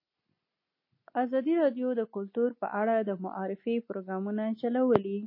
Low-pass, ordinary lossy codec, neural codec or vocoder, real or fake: 5.4 kHz; MP3, 24 kbps; none; real